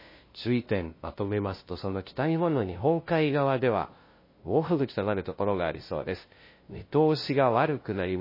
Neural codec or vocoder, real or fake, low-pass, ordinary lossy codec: codec, 16 kHz, 0.5 kbps, FunCodec, trained on LibriTTS, 25 frames a second; fake; 5.4 kHz; MP3, 24 kbps